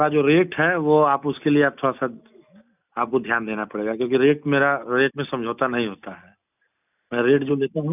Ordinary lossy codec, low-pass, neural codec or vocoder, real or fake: none; 3.6 kHz; none; real